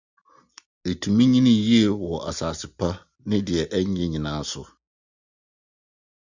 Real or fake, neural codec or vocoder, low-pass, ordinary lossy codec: real; none; 7.2 kHz; Opus, 64 kbps